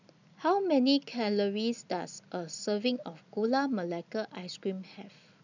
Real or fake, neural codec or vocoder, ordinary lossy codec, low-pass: real; none; none; 7.2 kHz